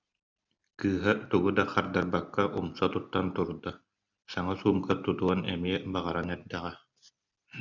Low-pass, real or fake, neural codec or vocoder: 7.2 kHz; real; none